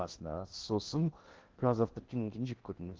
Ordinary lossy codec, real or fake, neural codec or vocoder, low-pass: Opus, 16 kbps; fake; codec, 16 kHz in and 24 kHz out, 0.6 kbps, FocalCodec, streaming, 2048 codes; 7.2 kHz